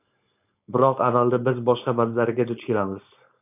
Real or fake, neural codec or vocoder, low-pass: fake; codec, 16 kHz, 4.8 kbps, FACodec; 3.6 kHz